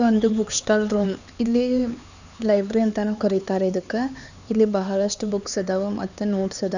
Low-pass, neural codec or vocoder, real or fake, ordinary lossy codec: 7.2 kHz; codec, 16 kHz, 4 kbps, X-Codec, HuBERT features, trained on LibriSpeech; fake; none